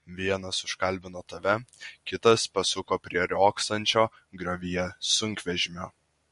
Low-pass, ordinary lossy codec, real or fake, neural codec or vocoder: 14.4 kHz; MP3, 48 kbps; real; none